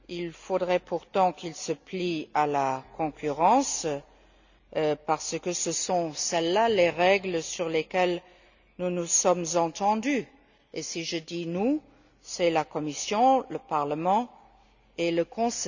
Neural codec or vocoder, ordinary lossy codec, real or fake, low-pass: none; none; real; 7.2 kHz